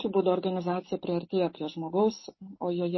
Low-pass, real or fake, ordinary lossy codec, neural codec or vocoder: 7.2 kHz; real; MP3, 24 kbps; none